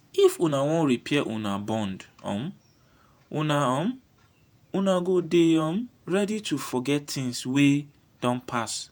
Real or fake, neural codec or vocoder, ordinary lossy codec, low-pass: fake; vocoder, 48 kHz, 128 mel bands, Vocos; none; none